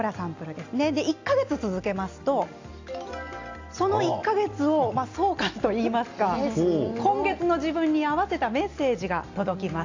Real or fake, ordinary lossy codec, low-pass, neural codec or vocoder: real; AAC, 48 kbps; 7.2 kHz; none